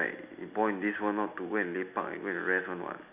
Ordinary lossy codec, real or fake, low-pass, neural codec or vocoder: none; real; 3.6 kHz; none